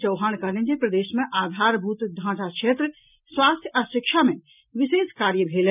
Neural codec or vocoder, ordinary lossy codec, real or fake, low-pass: none; none; real; 3.6 kHz